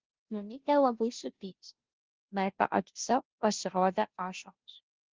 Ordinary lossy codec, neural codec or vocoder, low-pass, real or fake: Opus, 16 kbps; codec, 16 kHz, 0.5 kbps, FunCodec, trained on Chinese and English, 25 frames a second; 7.2 kHz; fake